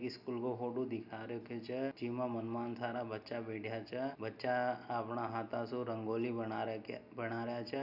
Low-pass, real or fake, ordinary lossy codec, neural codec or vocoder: 5.4 kHz; real; none; none